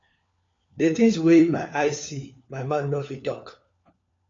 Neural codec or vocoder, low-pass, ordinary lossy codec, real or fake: codec, 16 kHz, 4 kbps, FunCodec, trained on LibriTTS, 50 frames a second; 7.2 kHz; AAC, 48 kbps; fake